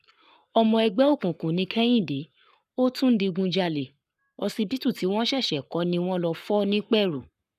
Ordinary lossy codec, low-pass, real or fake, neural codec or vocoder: none; 14.4 kHz; fake; codec, 44.1 kHz, 7.8 kbps, Pupu-Codec